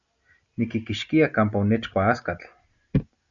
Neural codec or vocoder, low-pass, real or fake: none; 7.2 kHz; real